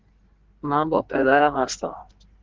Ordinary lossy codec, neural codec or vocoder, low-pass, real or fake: Opus, 16 kbps; codec, 16 kHz in and 24 kHz out, 1.1 kbps, FireRedTTS-2 codec; 7.2 kHz; fake